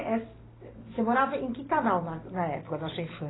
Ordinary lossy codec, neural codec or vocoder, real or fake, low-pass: AAC, 16 kbps; none; real; 7.2 kHz